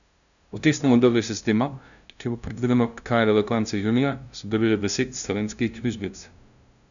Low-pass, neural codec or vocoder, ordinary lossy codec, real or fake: 7.2 kHz; codec, 16 kHz, 0.5 kbps, FunCodec, trained on LibriTTS, 25 frames a second; none; fake